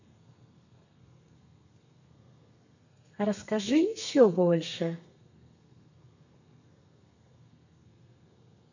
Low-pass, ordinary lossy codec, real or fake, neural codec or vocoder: 7.2 kHz; none; fake; codec, 32 kHz, 1.9 kbps, SNAC